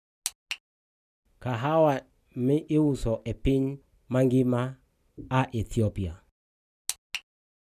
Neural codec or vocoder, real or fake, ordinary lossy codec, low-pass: none; real; none; 14.4 kHz